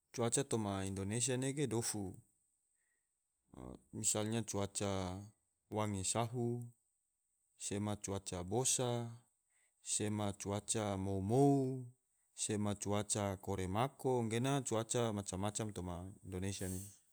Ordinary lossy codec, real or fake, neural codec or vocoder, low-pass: none; real; none; none